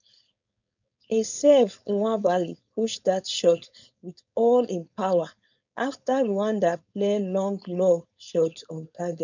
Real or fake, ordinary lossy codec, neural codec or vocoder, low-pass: fake; none; codec, 16 kHz, 4.8 kbps, FACodec; 7.2 kHz